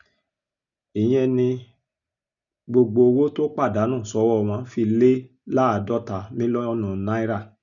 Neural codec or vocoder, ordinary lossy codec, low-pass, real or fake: none; none; 7.2 kHz; real